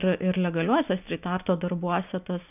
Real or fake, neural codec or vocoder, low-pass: real; none; 3.6 kHz